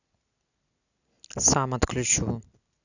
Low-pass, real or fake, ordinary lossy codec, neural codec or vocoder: 7.2 kHz; real; none; none